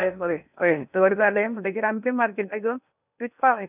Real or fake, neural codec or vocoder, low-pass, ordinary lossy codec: fake; codec, 16 kHz in and 24 kHz out, 0.8 kbps, FocalCodec, streaming, 65536 codes; 3.6 kHz; none